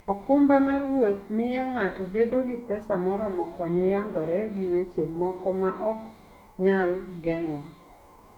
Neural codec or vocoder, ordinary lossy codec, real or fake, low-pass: codec, 44.1 kHz, 2.6 kbps, DAC; none; fake; 19.8 kHz